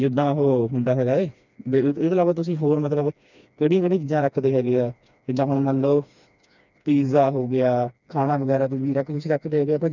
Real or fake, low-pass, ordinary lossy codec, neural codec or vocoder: fake; 7.2 kHz; none; codec, 16 kHz, 2 kbps, FreqCodec, smaller model